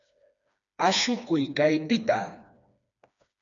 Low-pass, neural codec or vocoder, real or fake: 7.2 kHz; codec, 16 kHz, 2 kbps, FreqCodec, smaller model; fake